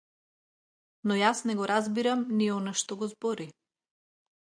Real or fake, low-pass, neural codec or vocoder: real; 9.9 kHz; none